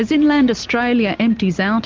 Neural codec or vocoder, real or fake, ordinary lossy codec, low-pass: none; real; Opus, 32 kbps; 7.2 kHz